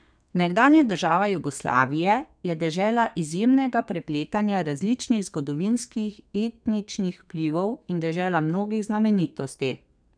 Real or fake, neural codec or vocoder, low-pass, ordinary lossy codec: fake; codec, 32 kHz, 1.9 kbps, SNAC; 9.9 kHz; none